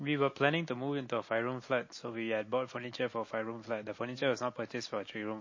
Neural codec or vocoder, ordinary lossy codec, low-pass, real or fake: none; MP3, 32 kbps; 7.2 kHz; real